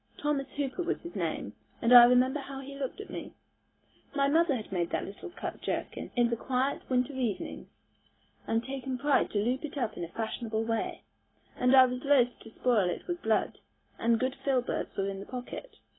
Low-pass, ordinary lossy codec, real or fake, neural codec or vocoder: 7.2 kHz; AAC, 16 kbps; real; none